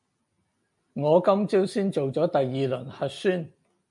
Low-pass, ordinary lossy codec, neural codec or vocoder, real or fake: 10.8 kHz; MP3, 96 kbps; none; real